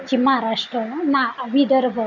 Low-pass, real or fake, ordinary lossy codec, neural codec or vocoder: 7.2 kHz; real; none; none